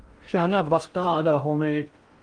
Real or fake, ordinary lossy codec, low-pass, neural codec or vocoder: fake; Opus, 32 kbps; 9.9 kHz; codec, 16 kHz in and 24 kHz out, 0.6 kbps, FocalCodec, streaming, 2048 codes